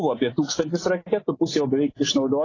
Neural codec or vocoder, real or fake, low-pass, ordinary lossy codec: none; real; 7.2 kHz; AAC, 32 kbps